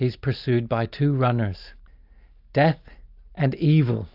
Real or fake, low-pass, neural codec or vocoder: real; 5.4 kHz; none